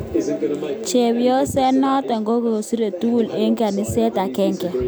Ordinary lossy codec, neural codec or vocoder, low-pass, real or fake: none; none; none; real